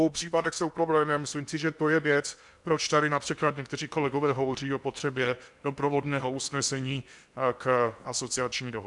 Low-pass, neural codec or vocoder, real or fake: 10.8 kHz; codec, 16 kHz in and 24 kHz out, 0.8 kbps, FocalCodec, streaming, 65536 codes; fake